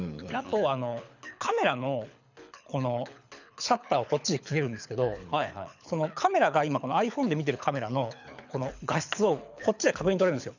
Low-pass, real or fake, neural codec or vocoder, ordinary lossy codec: 7.2 kHz; fake; codec, 24 kHz, 6 kbps, HILCodec; none